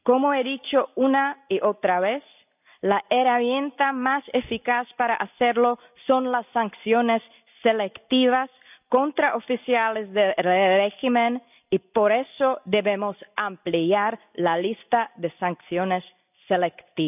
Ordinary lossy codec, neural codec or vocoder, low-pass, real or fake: none; none; 3.6 kHz; real